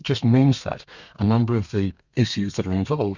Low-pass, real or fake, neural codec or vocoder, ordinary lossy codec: 7.2 kHz; fake; codec, 32 kHz, 1.9 kbps, SNAC; Opus, 64 kbps